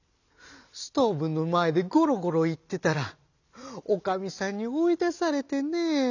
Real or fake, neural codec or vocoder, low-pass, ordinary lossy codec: real; none; 7.2 kHz; none